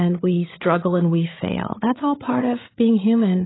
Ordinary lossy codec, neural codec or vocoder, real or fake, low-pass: AAC, 16 kbps; codec, 16 kHz, 8 kbps, FreqCodec, larger model; fake; 7.2 kHz